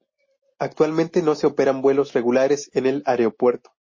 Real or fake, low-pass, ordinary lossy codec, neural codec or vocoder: real; 7.2 kHz; MP3, 32 kbps; none